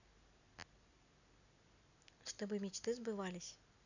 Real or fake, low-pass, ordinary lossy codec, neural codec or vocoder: real; 7.2 kHz; none; none